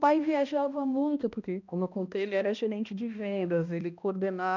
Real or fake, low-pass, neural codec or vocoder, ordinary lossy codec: fake; 7.2 kHz; codec, 16 kHz, 1 kbps, X-Codec, HuBERT features, trained on balanced general audio; none